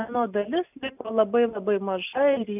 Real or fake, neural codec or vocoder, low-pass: real; none; 3.6 kHz